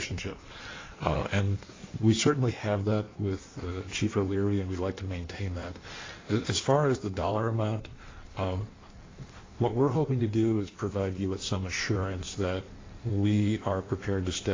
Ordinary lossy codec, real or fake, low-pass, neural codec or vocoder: AAC, 32 kbps; fake; 7.2 kHz; codec, 16 kHz in and 24 kHz out, 1.1 kbps, FireRedTTS-2 codec